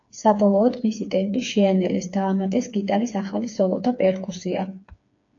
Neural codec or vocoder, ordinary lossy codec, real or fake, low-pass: codec, 16 kHz, 4 kbps, FreqCodec, smaller model; AAC, 48 kbps; fake; 7.2 kHz